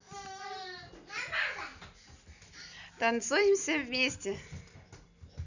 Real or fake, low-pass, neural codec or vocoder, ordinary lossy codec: real; 7.2 kHz; none; none